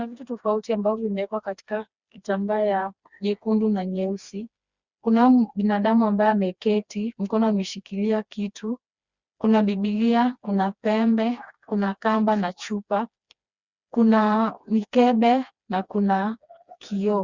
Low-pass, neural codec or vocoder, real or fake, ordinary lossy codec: 7.2 kHz; codec, 16 kHz, 2 kbps, FreqCodec, smaller model; fake; Opus, 64 kbps